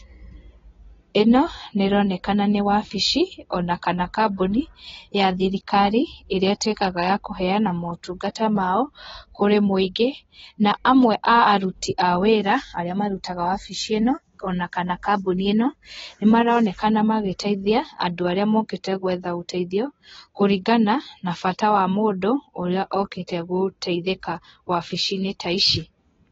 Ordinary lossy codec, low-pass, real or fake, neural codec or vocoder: AAC, 24 kbps; 7.2 kHz; real; none